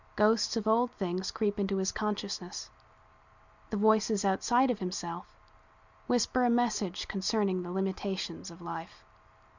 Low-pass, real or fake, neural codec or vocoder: 7.2 kHz; real; none